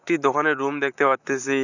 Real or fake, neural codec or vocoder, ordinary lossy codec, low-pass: fake; vocoder, 44.1 kHz, 128 mel bands, Pupu-Vocoder; none; 7.2 kHz